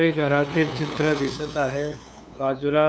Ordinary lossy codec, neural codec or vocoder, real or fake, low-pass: none; codec, 16 kHz, 2 kbps, FunCodec, trained on LibriTTS, 25 frames a second; fake; none